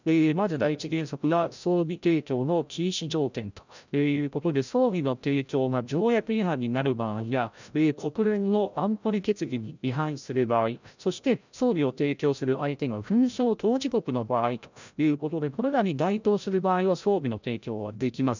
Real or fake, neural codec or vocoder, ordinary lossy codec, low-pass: fake; codec, 16 kHz, 0.5 kbps, FreqCodec, larger model; none; 7.2 kHz